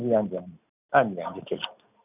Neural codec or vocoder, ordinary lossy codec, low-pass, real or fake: none; none; 3.6 kHz; real